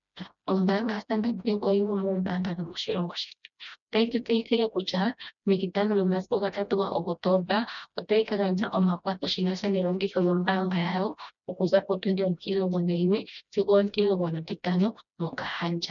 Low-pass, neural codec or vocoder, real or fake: 7.2 kHz; codec, 16 kHz, 1 kbps, FreqCodec, smaller model; fake